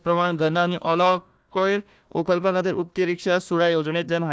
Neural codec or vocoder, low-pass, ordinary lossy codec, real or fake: codec, 16 kHz, 1 kbps, FunCodec, trained on Chinese and English, 50 frames a second; none; none; fake